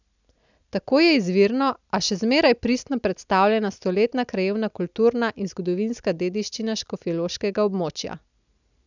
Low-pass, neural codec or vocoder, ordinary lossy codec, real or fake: 7.2 kHz; none; none; real